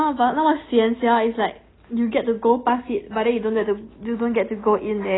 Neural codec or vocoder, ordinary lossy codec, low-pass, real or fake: none; AAC, 16 kbps; 7.2 kHz; real